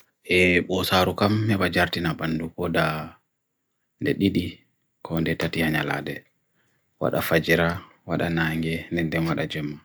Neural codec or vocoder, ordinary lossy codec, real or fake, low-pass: none; none; real; none